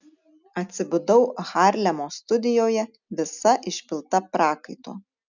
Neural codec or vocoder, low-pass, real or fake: none; 7.2 kHz; real